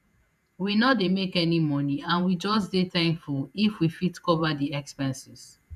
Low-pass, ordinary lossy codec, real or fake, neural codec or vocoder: 14.4 kHz; none; fake; vocoder, 48 kHz, 128 mel bands, Vocos